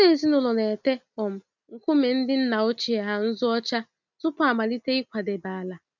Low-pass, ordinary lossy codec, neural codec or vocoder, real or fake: 7.2 kHz; none; none; real